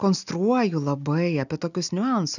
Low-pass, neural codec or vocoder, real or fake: 7.2 kHz; none; real